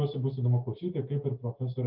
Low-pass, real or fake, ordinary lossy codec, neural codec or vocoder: 5.4 kHz; real; Opus, 32 kbps; none